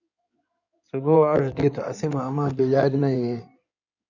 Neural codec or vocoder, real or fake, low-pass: codec, 16 kHz in and 24 kHz out, 2.2 kbps, FireRedTTS-2 codec; fake; 7.2 kHz